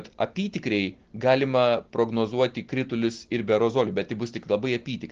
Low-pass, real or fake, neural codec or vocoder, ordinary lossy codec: 7.2 kHz; real; none; Opus, 16 kbps